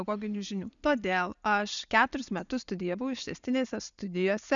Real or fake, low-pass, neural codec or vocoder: real; 7.2 kHz; none